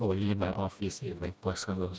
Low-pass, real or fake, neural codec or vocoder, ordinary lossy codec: none; fake; codec, 16 kHz, 1 kbps, FreqCodec, smaller model; none